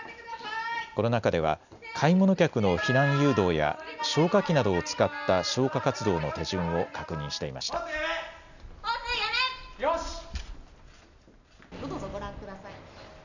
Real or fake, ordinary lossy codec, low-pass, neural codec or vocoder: real; none; 7.2 kHz; none